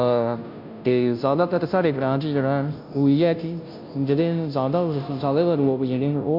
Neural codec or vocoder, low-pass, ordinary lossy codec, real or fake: codec, 16 kHz, 0.5 kbps, FunCodec, trained on Chinese and English, 25 frames a second; 5.4 kHz; MP3, 48 kbps; fake